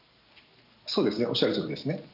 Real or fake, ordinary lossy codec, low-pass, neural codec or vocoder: real; none; 5.4 kHz; none